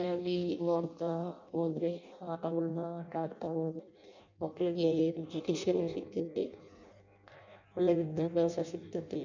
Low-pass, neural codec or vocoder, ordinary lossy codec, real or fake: 7.2 kHz; codec, 16 kHz in and 24 kHz out, 0.6 kbps, FireRedTTS-2 codec; none; fake